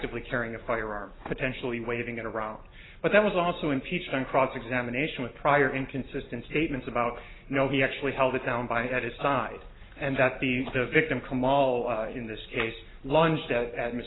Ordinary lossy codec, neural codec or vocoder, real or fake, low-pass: AAC, 16 kbps; none; real; 7.2 kHz